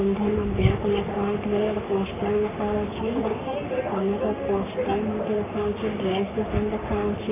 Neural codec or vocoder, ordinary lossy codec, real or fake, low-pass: codec, 44.1 kHz, 3.4 kbps, Pupu-Codec; MP3, 32 kbps; fake; 3.6 kHz